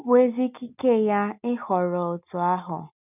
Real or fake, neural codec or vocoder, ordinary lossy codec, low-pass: real; none; none; 3.6 kHz